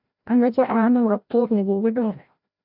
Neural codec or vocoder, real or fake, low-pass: codec, 16 kHz, 0.5 kbps, FreqCodec, larger model; fake; 5.4 kHz